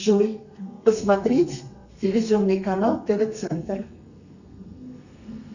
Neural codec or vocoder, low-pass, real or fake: codec, 32 kHz, 1.9 kbps, SNAC; 7.2 kHz; fake